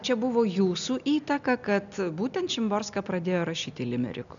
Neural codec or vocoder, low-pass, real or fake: none; 7.2 kHz; real